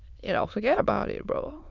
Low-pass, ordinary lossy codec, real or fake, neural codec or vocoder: 7.2 kHz; none; fake; autoencoder, 22.05 kHz, a latent of 192 numbers a frame, VITS, trained on many speakers